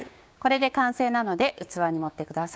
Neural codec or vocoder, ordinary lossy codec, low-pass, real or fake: codec, 16 kHz, 6 kbps, DAC; none; none; fake